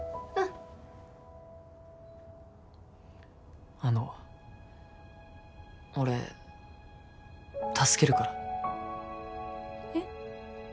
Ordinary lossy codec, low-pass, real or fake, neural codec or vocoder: none; none; real; none